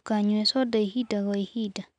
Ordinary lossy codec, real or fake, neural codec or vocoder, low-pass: MP3, 96 kbps; real; none; 9.9 kHz